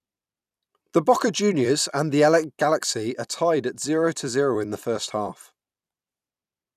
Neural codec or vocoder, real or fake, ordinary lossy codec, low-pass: vocoder, 44.1 kHz, 128 mel bands every 256 samples, BigVGAN v2; fake; none; 14.4 kHz